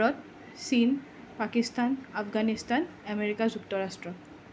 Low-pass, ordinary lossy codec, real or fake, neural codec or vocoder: none; none; real; none